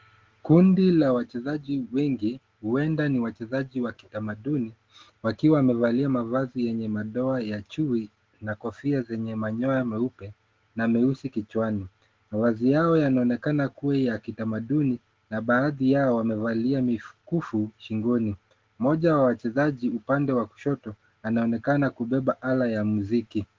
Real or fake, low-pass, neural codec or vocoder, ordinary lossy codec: real; 7.2 kHz; none; Opus, 16 kbps